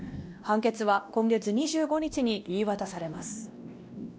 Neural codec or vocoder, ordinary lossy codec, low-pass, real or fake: codec, 16 kHz, 1 kbps, X-Codec, WavLM features, trained on Multilingual LibriSpeech; none; none; fake